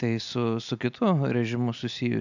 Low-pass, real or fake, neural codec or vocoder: 7.2 kHz; real; none